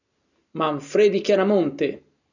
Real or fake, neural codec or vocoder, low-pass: real; none; 7.2 kHz